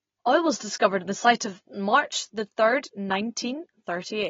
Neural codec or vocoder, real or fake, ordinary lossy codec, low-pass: none; real; AAC, 24 kbps; 7.2 kHz